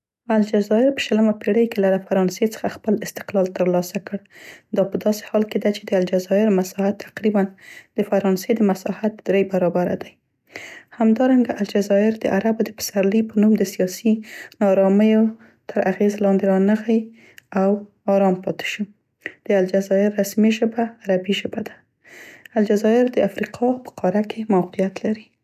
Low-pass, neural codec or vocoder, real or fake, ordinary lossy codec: 14.4 kHz; none; real; none